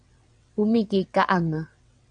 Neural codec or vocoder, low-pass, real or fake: vocoder, 22.05 kHz, 80 mel bands, WaveNeXt; 9.9 kHz; fake